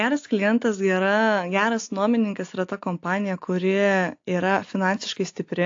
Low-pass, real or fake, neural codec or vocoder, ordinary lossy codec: 7.2 kHz; real; none; AAC, 48 kbps